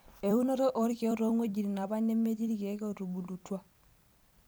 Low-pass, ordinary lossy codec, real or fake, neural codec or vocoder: none; none; fake; vocoder, 44.1 kHz, 128 mel bands every 256 samples, BigVGAN v2